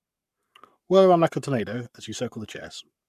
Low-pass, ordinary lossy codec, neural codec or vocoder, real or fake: 14.4 kHz; none; codec, 44.1 kHz, 7.8 kbps, Pupu-Codec; fake